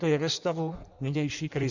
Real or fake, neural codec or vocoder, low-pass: fake; codec, 16 kHz in and 24 kHz out, 1.1 kbps, FireRedTTS-2 codec; 7.2 kHz